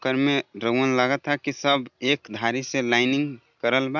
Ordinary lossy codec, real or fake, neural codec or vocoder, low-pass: none; real; none; 7.2 kHz